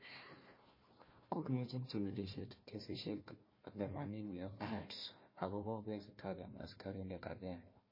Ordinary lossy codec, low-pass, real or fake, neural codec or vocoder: MP3, 24 kbps; 5.4 kHz; fake; codec, 16 kHz, 1 kbps, FunCodec, trained on Chinese and English, 50 frames a second